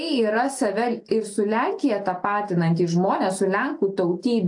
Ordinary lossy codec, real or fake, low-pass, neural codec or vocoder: AAC, 64 kbps; real; 10.8 kHz; none